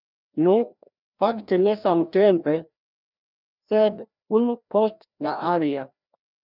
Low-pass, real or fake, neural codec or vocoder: 5.4 kHz; fake; codec, 16 kHz, 1 kbps, FreqCodec, larger model